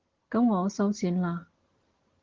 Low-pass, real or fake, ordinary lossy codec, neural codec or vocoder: 7.2 kHz; real; Opus, 16 kbps; none